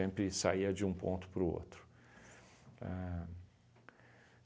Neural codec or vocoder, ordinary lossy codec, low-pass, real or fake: none; none; none; real